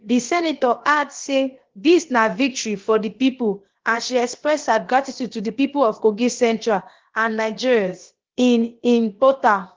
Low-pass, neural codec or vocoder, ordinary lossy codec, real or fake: 7.2 kHz; codec, 16 kHz, about 1 kbps, DyCAST, with the encoder's durations; Opus, 16 kbps; fake